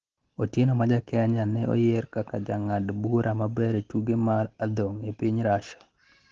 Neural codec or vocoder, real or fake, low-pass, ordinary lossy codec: none; real; 7.2 kHz; Opus, 16 kbps